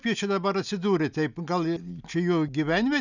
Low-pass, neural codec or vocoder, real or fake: 7.2 kHz; none; real